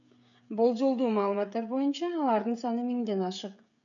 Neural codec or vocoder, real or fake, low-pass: codec, 16 kHz, 16 kbps, FreqCodec, smaller model; fake; 7.2 kHz